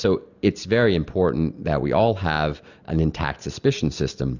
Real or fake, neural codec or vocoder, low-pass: real; none; 7.2 kHz